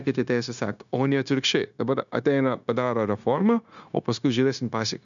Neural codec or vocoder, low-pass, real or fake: codec, 16 kHz, 0.9 kbps, LongCat-Audio-Codec; 7.2 kHz; fake